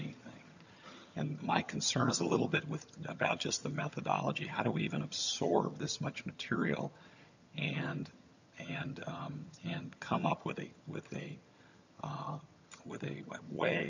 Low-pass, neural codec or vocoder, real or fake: 7.2 kHz; vocoder, 22.05 kHz, 80 mel bands, HiFi-GAN; fake